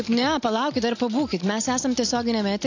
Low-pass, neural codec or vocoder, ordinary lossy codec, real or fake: 7.2 kHz; none; AAC, 48 kbps; real